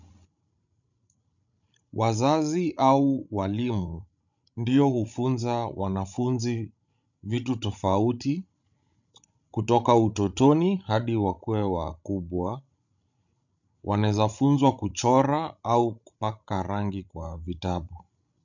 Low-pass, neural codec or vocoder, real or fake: 7.2 kHz; codec, 16 kHz, 16 kbps, FreqCodec, larger model; fake